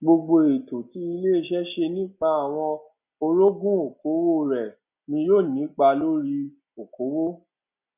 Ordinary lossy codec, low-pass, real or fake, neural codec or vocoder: none; 3.6 kHz; real; none